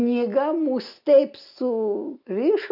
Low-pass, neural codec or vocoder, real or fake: 5.4 kHz; none; real